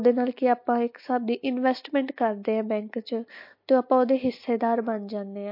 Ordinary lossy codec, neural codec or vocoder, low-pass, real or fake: MP3, 32 kbps; none; 5.4 kHz; real